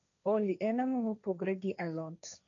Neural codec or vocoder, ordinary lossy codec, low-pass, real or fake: codec, 16 kHz, 1.1 kbps, Voila-Tokenizer; none; 7.2 kHz; fake